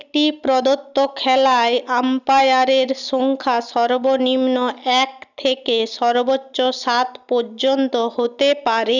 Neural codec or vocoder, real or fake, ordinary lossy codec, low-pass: none; real; none; 7.2 kHz